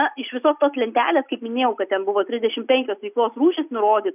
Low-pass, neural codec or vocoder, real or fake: 3.6 kHz; none; real